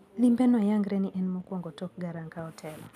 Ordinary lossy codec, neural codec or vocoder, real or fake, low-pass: none; none; real; 14.4 kHz